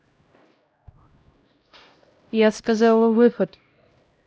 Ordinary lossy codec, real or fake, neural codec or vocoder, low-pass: none; fake; codec, 16 kHz, 0.5 kbps, X-Codec, HuBERT features, trained on LibriSpeech; none